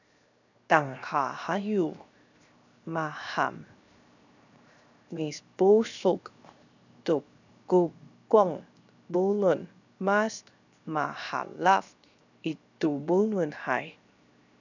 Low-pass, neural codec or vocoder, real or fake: 7.2 kHz; codec, 16 kHz, 0.7 kbps, FocalCodec; fake